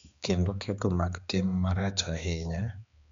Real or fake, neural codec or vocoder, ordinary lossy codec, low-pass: fake; codec, 16 kHz, 2 kbps, X-Codec, HuBERT features, trained on balanced general audio; MP3, 64 kbps; 7.2 kHz